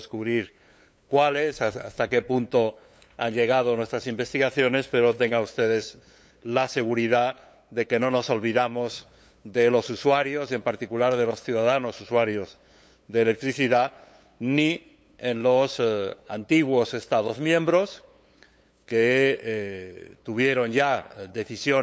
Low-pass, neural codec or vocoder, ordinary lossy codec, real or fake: none; codec, 16 kHz, 8 kbps, FunCodec, trained on LibriTTS, 25 frames a second; none; fake